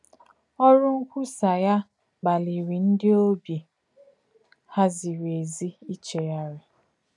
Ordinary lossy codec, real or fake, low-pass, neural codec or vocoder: none; real; 10.8 kHz; none